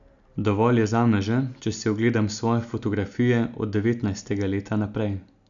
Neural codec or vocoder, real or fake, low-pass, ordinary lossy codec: none; real; 7.2 kHz; none